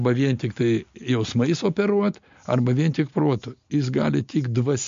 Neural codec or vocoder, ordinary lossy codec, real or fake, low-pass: none; MP3, 48 kbps; real; 7.2 kHz